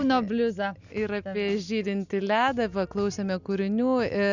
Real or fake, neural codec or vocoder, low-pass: real; none; 7.2 kHz